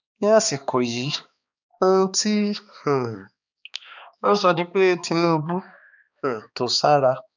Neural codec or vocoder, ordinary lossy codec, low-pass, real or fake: codec, 16 kHz, 2 kbps, X-Codec, HuBERT features, trained on balanced general audio; none; 7.2 kHz; fake